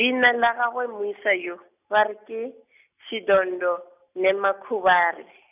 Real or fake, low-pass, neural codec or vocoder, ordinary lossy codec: real; 3.6 kHz; none; none